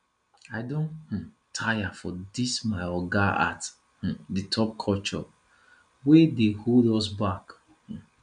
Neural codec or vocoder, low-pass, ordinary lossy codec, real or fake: none; 9.9 kHz; none; real